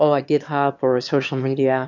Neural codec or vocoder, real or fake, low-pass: autoencoder, 22.05 kHz, a latent of 192 numbers a frame, VITS, trained on one speaker; fake; 7.2 kHz